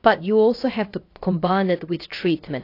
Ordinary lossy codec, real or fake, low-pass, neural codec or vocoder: AAC, 32 kbps; fake; 5.4 kHz; codec, 16 kHz, about 1 kbps, DyCAST, with the encoder's durations